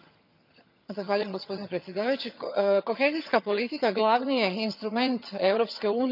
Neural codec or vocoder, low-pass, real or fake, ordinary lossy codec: vocoder, 22.05 kHz, 80 mel bands, HiFi-GAN; 5.4 kHz; fake; none